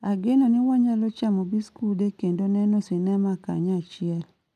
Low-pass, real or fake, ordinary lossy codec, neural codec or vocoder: 14.4 kHz; real; none; none